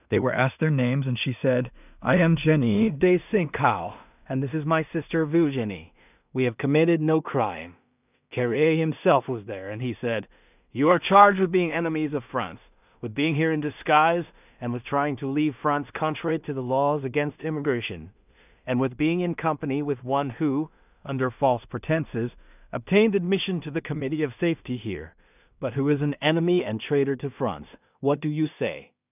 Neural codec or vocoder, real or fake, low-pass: codec, 16 kHz in and 24 kHz out, 0.4 kbps, LongCat-Audio-Codec, two codebook decoder; fake; 3.6 kHz